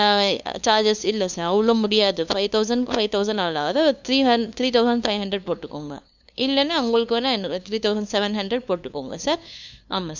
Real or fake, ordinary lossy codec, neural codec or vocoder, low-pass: fake; none; codec, 16 kHz, 2 kbps, FunCodec, trained on LibriTTS, 25 frames a second; 7.2 kHz